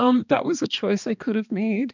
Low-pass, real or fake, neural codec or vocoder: 7.2 kHz; fake; codec, 16 kHz, 2 kbps, X-Codec, HuBERT features, trained on balanced general audio